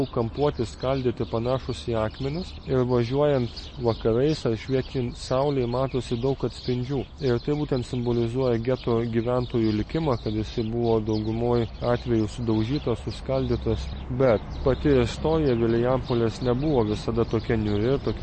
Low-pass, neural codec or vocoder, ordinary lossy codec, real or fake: 10.8 kHz; none; MP3, 32 kbps; real